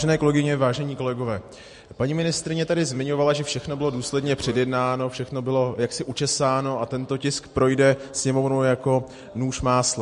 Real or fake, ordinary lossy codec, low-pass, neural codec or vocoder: real; MP3, 48 kbps; 14.4 kHz; none